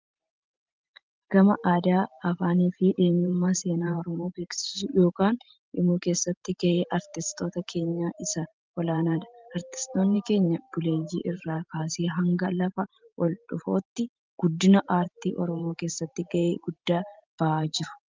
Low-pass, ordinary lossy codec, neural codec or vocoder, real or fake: 7.2 kHz; Opus, 32 kbps; vocoder, 44.1 kHz, 128 mel bands every 512 samples, BigVGAN v2; fake